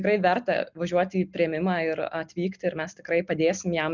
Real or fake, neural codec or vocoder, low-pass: real; none; 7.2 kHz